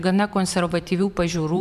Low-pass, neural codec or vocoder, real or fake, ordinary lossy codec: 14.4 kHz; vocoder, 44.1 kHz, 128 mel bands every 512 samples, BigVGAN v2; fake; MP3, 96 kbps